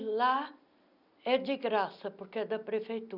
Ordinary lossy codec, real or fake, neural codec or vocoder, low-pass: none; real; none; 5.4 kHz